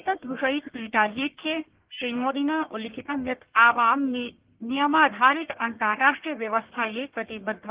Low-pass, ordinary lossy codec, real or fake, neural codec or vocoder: 3.6 kHz; Opus, 16 kbps; fake; codec, 44.1 kHz, 1.7 kbps, Pupu-Codec